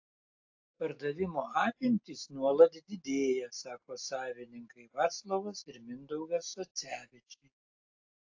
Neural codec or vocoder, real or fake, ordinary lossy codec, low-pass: none; real; AAC, 48 kbps; 7.2 kHz